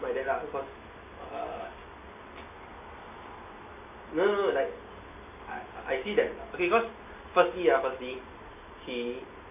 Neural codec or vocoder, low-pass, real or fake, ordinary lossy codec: none; 3.6 kHz; real; MP3, 24 kbps